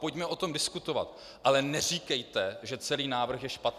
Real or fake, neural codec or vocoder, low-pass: real; none; 14.4 kHz